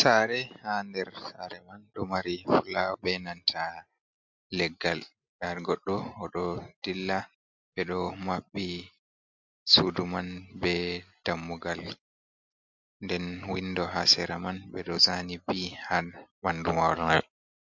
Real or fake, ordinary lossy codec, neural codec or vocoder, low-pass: real; MP3, 48 kbps; none; 7.2 kHz